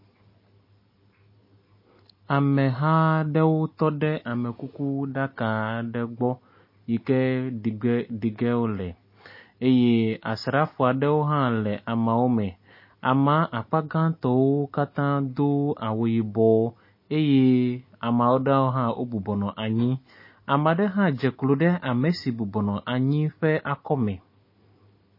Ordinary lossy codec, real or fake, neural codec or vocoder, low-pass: MP3, 24 kbps; real; none; 5.4 kHz